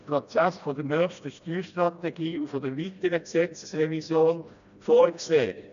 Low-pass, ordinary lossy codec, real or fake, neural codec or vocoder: 7.2 kHz; none; fake; codec, 16 kHz, 1 kbps, FreqCodec, smaller model